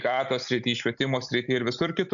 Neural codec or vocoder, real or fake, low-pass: none; real; 7.2 kHz